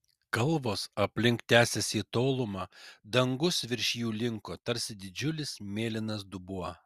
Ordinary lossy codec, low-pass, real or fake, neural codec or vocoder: Opus, 64 kbps; 14.4 kHz; real; none